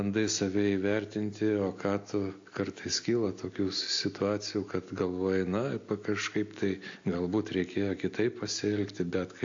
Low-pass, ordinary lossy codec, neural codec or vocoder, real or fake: 7.2 kHz; AAC, 48 kbps; none; real